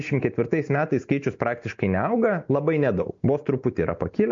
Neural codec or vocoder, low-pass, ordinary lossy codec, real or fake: none; 7.2 kHz; MP3, 48 kbps; real